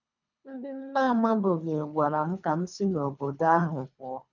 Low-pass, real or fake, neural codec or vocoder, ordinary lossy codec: 7.2 kHz; fake; codec, 24 kHz, 3 kbps, HILCodec; none